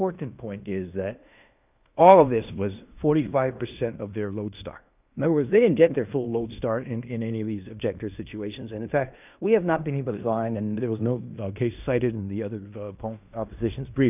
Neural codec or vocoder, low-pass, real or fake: codec, 16 kHz in and 24 kHz out, 0.9 kbps, LongCat-Audio-Codec, fine tuned four codebook decoder; 3.6 kHz; fake